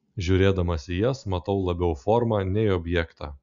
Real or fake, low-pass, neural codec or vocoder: real; 7.2 kHz; none